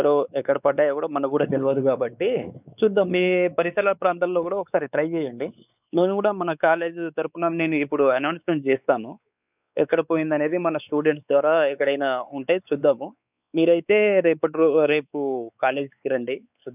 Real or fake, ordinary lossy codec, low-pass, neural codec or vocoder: fake; none; 3.6 kHz; codec, 16 kHz, 4 kbps, X-Codec, WavLM features, trained on Multilingual LibriSpeech